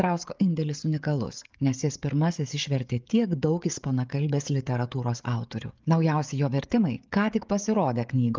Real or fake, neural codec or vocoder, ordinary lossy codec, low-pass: fake; codec, 16 kHz, 16 kbps, FreqCodec, smaller model; Opus, 24 kbps; 7.2 kHz